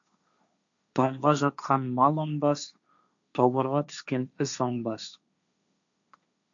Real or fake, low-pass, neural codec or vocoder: fake; 7.2 kHz; codec, 16 kHz, 1.1 kbps, Voila-Tokenizer